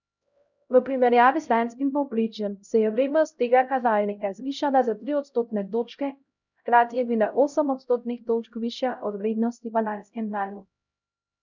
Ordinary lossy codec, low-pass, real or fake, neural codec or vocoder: none; 7.2 kHz; fake; codec, 16 kHz, 0.5 kbps, X-Codec, HuBERT features, trained on LibriSpeech